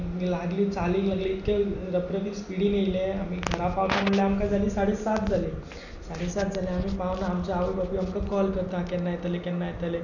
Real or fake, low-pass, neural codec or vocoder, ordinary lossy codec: real; 7.2 kHz; none; none